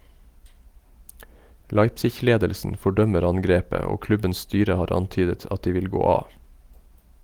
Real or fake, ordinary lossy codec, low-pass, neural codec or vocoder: real; Opus, 24 kbps; 19.8 kHz; none